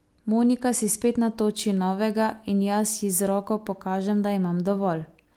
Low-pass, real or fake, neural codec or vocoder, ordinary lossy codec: 19.8 kHz; real; none; Opus, 24 kbps